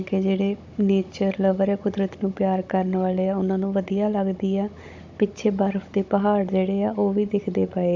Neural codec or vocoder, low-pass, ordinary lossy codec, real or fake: codec, 16 kHz, 16 kbps, FunCodec, trained on Chinese and English, 50 frames a second; 7.2 kHz; MP3, 48 kbps; fake